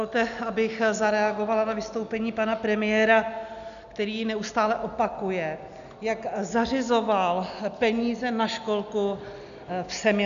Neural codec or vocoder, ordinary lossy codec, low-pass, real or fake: none; MP3, 96 kbps; 7.2 kHz; real